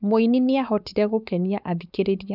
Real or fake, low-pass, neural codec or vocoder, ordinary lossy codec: fake; 5.4 kHz; codec, 16 kHz, 4.8 kbps, FACodec; none